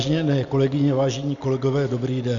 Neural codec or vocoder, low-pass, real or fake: none; 7.2 kHz; real